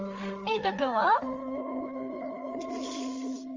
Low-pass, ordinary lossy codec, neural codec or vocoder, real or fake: 7.2 kHz; Opus, 32 kbps; codec, 16 kHz, 4 kbps, FreqCodec, larger model; fake